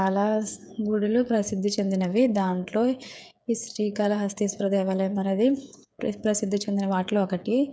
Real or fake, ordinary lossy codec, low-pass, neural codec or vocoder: fake; none; none; codec, 16 kHz, 8 kbps, FreqCodec, smaller model